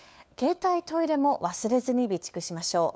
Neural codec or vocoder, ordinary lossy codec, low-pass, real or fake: codec, 16 kHz, 4 kbps, FunCodec, trained on LibriTTS, 50 frames a second; none; none; fake